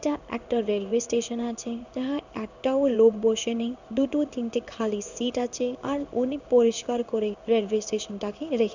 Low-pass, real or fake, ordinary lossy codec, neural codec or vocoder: 7.2 kHz; fake; none; codec, 16 kHz in and 24 kHz out, 1 kbps, XY-Tokenizer